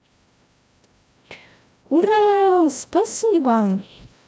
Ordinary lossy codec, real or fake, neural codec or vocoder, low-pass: none; fake; codec, 16 kHz, 0.5 kbps, FreqCodec, larger model; none